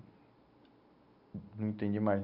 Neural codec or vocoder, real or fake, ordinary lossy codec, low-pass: none; real; Opus, 64 kbps; 5.4 kHz